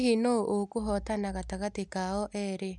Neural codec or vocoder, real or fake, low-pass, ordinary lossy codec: none; real; 10.8 kHz; none